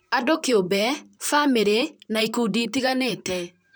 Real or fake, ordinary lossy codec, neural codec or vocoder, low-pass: fake; none; vocoder, 44.1 kHz, 128 mel bands, Pupu-Vocoder; none